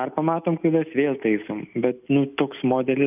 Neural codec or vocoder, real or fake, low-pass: none; real; 3.6 kHz